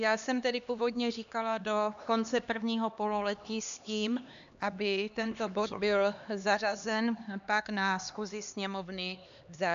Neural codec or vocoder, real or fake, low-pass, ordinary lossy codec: codec, 16 kHz, 2 kbps, X-Codec, HuBERT features, trained on LibriSpeech; fake; 7.2 kHz; AAC, 96 kbps